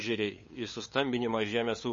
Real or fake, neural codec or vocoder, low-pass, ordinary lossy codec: fake; codec, 16 kHz, 8 kbps, FunCodec, trained on LibriTTS, 25 frames a second; 7.2 kHz; MP3, 32 kbps